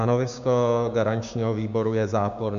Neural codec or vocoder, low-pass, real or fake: codec, 16 kHz, 6 kbps, DAC; 7.2 kHz; fake